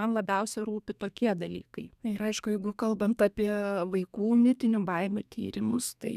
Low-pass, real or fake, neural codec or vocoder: 14.4 kHz; fake; codec, 32 kHz, 1.9 kbps, SNAC